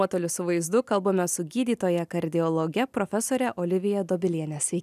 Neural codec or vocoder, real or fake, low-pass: none; real; 14.4 kHz